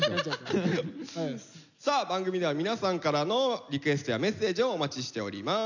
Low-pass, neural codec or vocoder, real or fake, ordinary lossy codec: 7.2 kHz; none; real; none